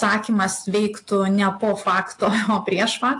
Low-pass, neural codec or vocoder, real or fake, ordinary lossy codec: 10.8 kHz; none; real; AAC, 48 kbps